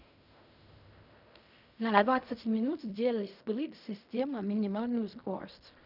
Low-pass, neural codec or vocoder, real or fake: 5.4 kHz; codec, 16 kHz in and 24 kHz out, 0.4 kbps, LongCat-Audio-Codec, fine tuned four codebook decoder; fake